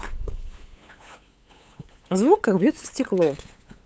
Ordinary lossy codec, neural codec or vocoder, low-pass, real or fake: none; codec, 16 kHz, 8 kbps, FunCodec, trained on LibriTTS, 25 frames a second; none; fake